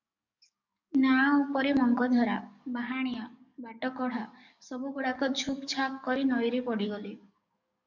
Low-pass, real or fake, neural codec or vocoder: 7.2 kHz; fake; codec, 44.1 kHz, 7.8 kbps, DAC